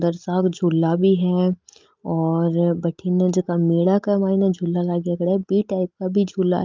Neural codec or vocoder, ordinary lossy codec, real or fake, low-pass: none; Opus, 24 kbps; real; 7.2 kHz